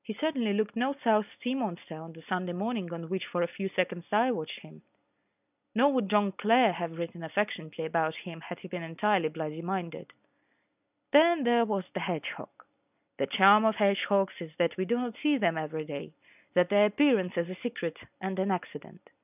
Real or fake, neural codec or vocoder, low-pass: real; none; 3.6 kHz